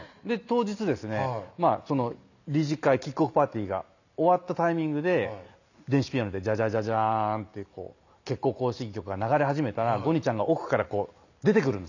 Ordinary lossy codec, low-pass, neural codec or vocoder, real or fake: none; 7.2 kHz; none; real